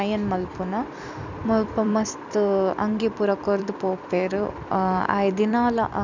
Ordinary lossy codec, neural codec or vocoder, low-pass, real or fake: none; vocoder, 44.1 kHz, 128 mel bands every 256 samples, BigVGAN v2; 7.2 kHz; fake